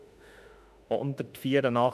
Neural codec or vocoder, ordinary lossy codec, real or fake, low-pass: autoencoder, 48 kHz, 32 numbers a frame, DAC-VAE, trained on Japanese speech; none; fake; 14.4 kHz